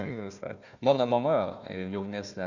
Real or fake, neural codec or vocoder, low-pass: fake; codec, 16 kHz, 1 kbps, FunCodec, trained on Chinese and English, 50 frames a second; 7.2 kHz